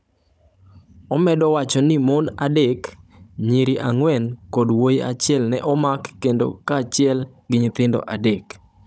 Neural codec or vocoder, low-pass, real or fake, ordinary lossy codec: codec, 16 kHz, 16 kbps, FunCodec, trained on Chinese and English, 50 frames a second; none; fake; none